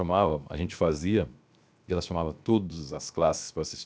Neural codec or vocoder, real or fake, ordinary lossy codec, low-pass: codec, 16 kHz, 0.7 kbps, FocalCodec; fake; none; none